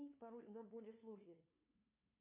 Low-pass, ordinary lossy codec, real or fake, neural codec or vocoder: 3.6 kHz; MP3, 32 kbps; fake; codec, 16 kHz, 2 kbps, FunCodec, trained on LibriTTS, 25 frames a second